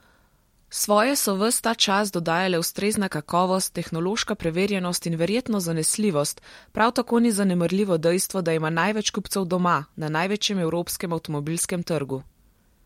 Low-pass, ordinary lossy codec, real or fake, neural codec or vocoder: 19.8 kHz; MP3, 64 kbps; real; none